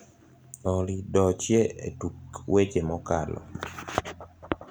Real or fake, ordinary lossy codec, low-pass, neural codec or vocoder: real; none; none; none